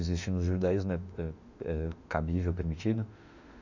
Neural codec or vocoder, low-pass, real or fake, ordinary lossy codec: autoencoder, 48 kHz, 32 numbers a frame, DAC-VAE, trained on Japanese speech; 7.2 kHz; fake; none